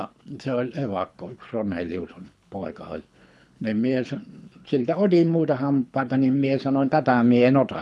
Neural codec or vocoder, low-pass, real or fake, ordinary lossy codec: codec, 24 kHz, 6 kbps, HILCodec; none; fake; none